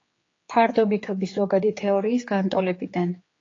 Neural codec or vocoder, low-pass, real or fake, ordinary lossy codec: codec, 16 kHz, 4 kbps, X-Codec, HuBERT features, trained on general audio; 7.2 kHz; fake; AAC, 32 kbps